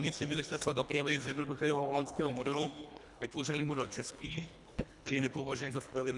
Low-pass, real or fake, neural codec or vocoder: 10.8 kHz; fake; codec, 24 kHz, 1.5 kbps, HILCodec